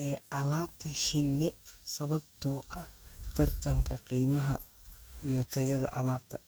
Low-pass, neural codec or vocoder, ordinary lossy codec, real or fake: none; codec, 44.1 kHz, 2.6 kbps, DAC; none; fake